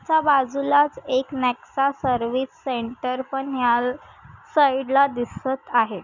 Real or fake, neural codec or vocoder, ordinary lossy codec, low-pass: real; none; none; 7.2 kHz